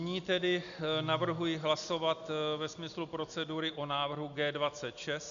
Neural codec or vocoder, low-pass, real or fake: none; 7.2 kHz; real